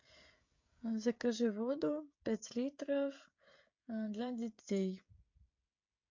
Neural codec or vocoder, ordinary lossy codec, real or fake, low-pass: codec, 16 kHz, 16 kbps, FreqCodec, smaller model; MP3, 48 kbps; fake; 7.2 kHz